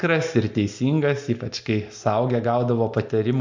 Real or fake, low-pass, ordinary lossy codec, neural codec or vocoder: real; 7.2 kHz; MP3, 64 kbps; none